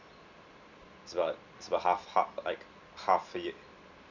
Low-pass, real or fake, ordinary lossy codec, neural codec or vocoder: 7.2 kHz; real; none; none